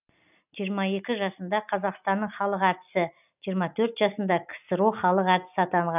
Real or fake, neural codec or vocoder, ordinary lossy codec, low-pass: real; none; none; 3.6 kHz